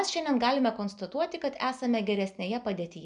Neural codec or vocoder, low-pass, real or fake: none; 9.9 kHz; real